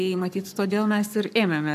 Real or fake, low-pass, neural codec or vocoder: fake; 14.4 kHz; codec, 44.1 kHz, 7.8 kbps, Pupu-Codec